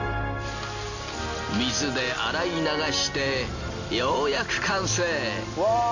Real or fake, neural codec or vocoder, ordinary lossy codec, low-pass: real; none; none; 7.2 kHz